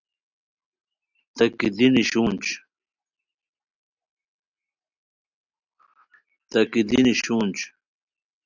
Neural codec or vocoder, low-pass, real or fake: none; 7.2 kHz; real